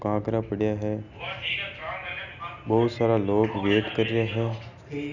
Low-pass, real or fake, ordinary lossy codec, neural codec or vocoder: 7.2 kHz; real; none; none